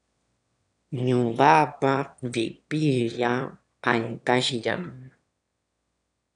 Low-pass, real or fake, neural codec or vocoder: 9.9 kHz; fake; autoencoder, 22.05 kHz, a latent of 192 numbers a frame, VITS, trained on one speaker